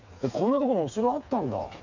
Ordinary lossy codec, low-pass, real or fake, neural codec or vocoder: none; 7.2 kHz; fake; codec, 16 kHz, 4 kbps, FreqCodec, smaller model